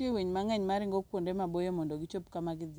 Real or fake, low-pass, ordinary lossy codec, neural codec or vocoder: real; none; none; none